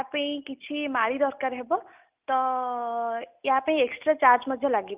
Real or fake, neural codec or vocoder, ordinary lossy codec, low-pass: real; none; Opus, 32 kbps; 3.6 kHz